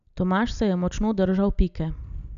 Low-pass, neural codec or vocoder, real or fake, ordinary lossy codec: 7.2 kHz; none; real; none